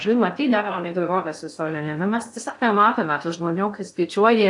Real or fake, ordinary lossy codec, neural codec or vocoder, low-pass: fake; AAC, 64 kbps; codec, 16 kHz in and 24 kHz out, 0.6 kbps, FocalCodec, streaming, 4096 codes; 10.8 kHz